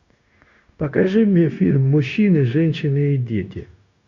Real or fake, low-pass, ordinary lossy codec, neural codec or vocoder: fake; 7.2 kHz; Opus, 64 kbps; codec, 16 kHz, 0.9 kbps, LongCat-Audio-Codec